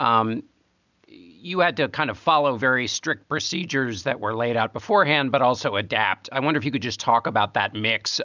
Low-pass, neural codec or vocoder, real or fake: 7.2 kHz; none; real